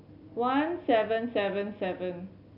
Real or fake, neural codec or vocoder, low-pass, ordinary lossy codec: real; none; 5.4 kHz; none